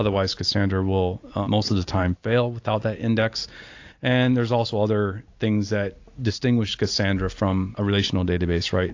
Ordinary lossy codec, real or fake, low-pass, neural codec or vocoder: AAC, 48 kbps; real; 7.2 kHz; none